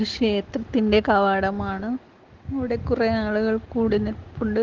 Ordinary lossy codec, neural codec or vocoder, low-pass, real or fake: Opus, 16 kbps; none; 7.2 kHz; real